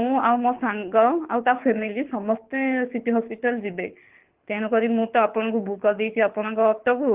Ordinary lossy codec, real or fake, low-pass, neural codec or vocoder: Opus, 16 kbps; fake; 3.6 kHz; codec, 24 kHz, 6 kbps, HILCodec